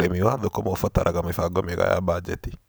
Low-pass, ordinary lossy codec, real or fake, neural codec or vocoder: none; none; real; none